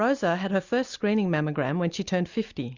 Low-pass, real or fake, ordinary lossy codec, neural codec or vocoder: 7.2 kHz; real; Opus, 64 kbps; none